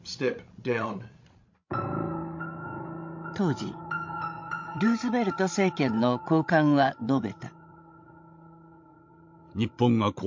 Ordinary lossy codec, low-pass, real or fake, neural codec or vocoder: MP3, 48 kbps; 7.2 kHz; fake; codec, 16 kHz, 16 kbps, FreqCodec, larger model